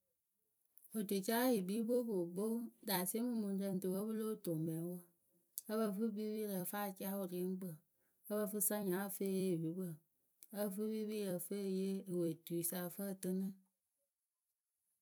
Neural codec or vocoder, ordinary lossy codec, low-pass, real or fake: vocoder, 44.1 kHz, 128 mel bands every 256 samples, BigVGAN v2; none; none; fake